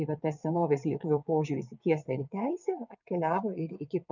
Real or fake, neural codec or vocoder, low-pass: fake; vocoder, 22.05 kHz, 80 mel bands, WaveNeXt; 7.2 kHz